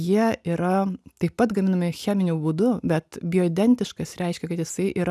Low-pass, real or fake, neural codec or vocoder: 14.4 kHz; real; none